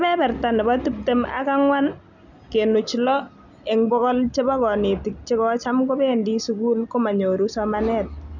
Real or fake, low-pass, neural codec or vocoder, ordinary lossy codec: real; 7.2 kHz; none; none